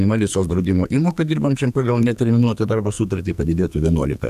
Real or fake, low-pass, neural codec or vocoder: fake; 14.4 kHz; codec, 44.1 kHz, 2.6 kbps, SNAC